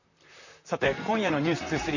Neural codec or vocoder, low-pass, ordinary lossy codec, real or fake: vocoder, 44.1 kHz, 128 mel bands, Pupu-Vocoder; 7.2 kHz; none; fake